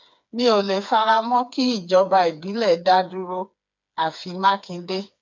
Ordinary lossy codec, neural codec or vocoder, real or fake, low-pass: MP3, 64 kbps; codec, 16 kHz, 4 kbps, FreqCodec, smaller model; fake; 7.2 kHz